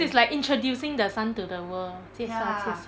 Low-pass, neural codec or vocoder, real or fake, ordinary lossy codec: none; none; real; none